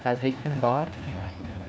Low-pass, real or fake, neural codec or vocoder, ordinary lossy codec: none; fake; codec, 16 kHz, 1 kbps, FunCodec, trained on LibriTTS, 50 frames a second; none